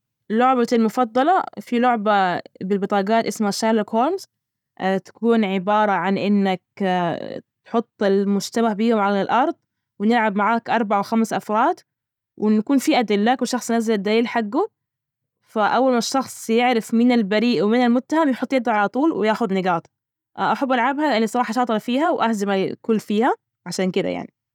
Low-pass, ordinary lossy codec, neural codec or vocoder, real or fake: 19.8 kHz; none; none; real